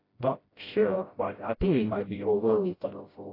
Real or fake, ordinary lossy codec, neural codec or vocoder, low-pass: fake; AAC, 24 kbps; codec, 16 kHz, 0.5 kbps, FreqCodec, smaller model; 5.4 kHz